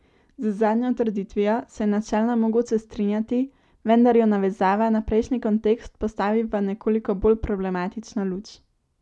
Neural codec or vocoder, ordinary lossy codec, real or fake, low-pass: none; none; real; none